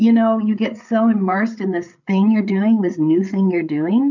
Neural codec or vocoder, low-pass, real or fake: codec, 16 kHz, 8 kbps, FreqCodec, larger model; 7.2 kHz; fake